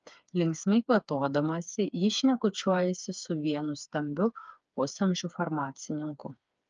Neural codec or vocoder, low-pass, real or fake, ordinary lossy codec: codec, 16 kHz, 4 kbps, FreqCodec, smaller model; 7.2 kHz; fake; Opus, 24 kbps